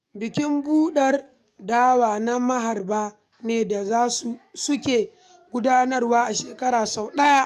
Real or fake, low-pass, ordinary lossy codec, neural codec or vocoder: fake; 14.4 kHz; none; codec, 44.1 kHz, 7.8 kbps, DAC